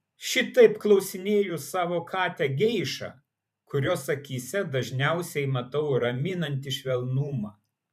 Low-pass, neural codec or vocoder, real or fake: 14.4 kHz; vocoder, 44.1 kHz, 128 mel bands every 512 samples, BigVGAN v2; fake